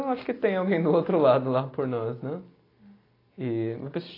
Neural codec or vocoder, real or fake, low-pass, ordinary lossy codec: none; real; 5.4 kHz; AAC, 24 kbps